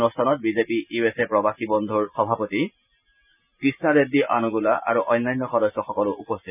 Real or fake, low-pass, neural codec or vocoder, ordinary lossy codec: real; 3.6 kHz; none; none